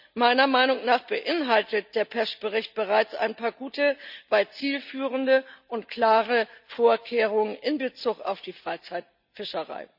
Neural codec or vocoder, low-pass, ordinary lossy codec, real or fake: none; 5.4 kHz; none; real